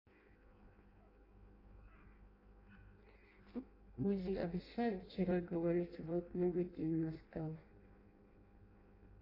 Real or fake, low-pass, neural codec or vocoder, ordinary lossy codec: fake; 5.4 kHz; codec, 16 kHz in and 24 kHz out, 0.6 kbps, FireRedTTS-2 codec; AAC, 24 kbps